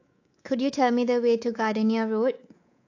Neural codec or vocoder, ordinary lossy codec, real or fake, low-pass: codec, 24 kHz, 3.1 kbps, DualCodec; AAC, 48 kbps; fake; 7.2 kHz